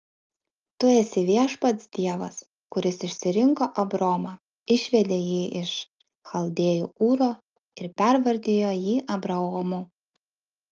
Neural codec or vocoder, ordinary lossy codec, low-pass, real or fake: none; Opus, 24 kbps; 7.2 kHz; real